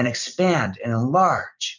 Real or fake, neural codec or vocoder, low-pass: fake; vocoder, 44.1 kHz, 128 mel bands every 256 samples, BigVGAN v2; 7.2 kHz